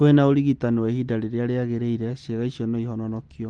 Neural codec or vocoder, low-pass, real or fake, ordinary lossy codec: none; 9.9 kHz; real; Opus, 24 kbps